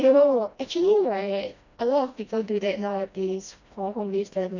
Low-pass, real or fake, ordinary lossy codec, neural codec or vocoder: 7.2 kHz; fake; none; codec, 16 kHz, 1 kbps, FreqCodec, smaller model